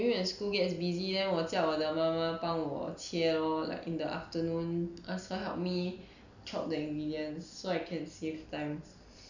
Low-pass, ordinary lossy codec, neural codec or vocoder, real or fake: 7.2 kHz; none; none; real